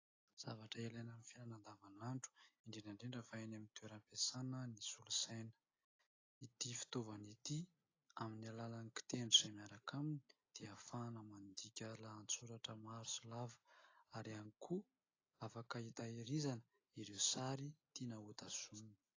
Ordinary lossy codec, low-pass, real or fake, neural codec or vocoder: AAC, 32 kbps; 7.2 kHz; real; none